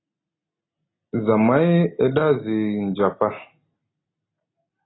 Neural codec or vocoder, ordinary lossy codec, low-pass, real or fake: none; AAC, 16 kbps; 7.2 kHz; real